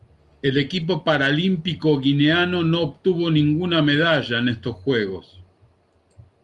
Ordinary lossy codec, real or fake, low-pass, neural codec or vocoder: Opus, 24 kbps; real; 10.8 kHz; none